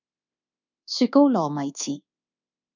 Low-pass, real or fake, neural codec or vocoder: 7.2 kHz; fake; codec, 24 kHz, 1.2 kbps, DualCodec